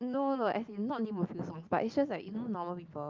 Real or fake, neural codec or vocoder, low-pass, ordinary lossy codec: real; none; 7.2 kHz; Opus, 32 kbps